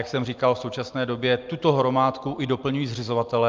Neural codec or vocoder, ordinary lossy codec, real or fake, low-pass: none; Opus, 24 kbps; real; 7.2 kHz